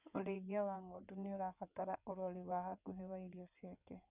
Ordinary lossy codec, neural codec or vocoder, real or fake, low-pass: none; codec, 16 kHz in and 24 kHz out, 2.2 kbps, FireRedTTS-2 codec; fake; 3.6 kHz